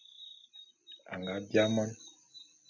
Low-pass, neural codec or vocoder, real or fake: 7.2 kHz; none; real